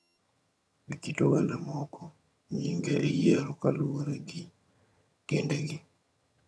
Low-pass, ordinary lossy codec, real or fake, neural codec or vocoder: none; none; fake; vocoder, 22.05 kHz, 80 mel bands, HiFi-GAN